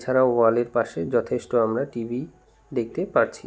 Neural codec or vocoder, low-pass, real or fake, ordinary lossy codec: none; none; real; none